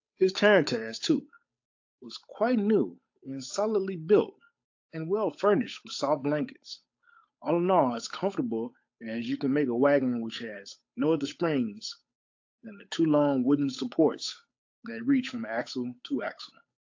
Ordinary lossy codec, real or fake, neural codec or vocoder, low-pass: AAC, 48 kbps; fake; codec, 16 kHz, 8 kbps, FunCodec, trained on Chinese and English, 25 frames a second; 7.2 kHz